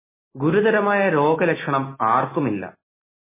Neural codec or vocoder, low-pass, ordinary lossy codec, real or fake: none; 3.6 kHz; MP3, 16 kbps; real